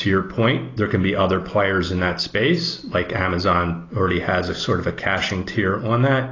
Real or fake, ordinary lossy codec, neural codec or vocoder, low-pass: real; AAC, 32 kbps; none; 7.2 kHz